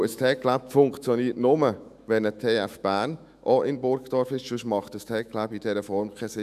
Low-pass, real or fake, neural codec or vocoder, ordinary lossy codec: 14.4 kHz; fake; autoencoder, 48 kHz, 128 numbers a frame, DAC-VAE, trained on Japanese speech; none